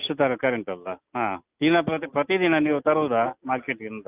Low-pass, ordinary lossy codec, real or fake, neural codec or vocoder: 3.6 kHz; Opus, 24 kbps; real; none